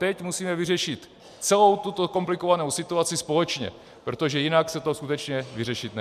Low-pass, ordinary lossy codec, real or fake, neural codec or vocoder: 14.4 kHz; MP3, 96 kbps; real; none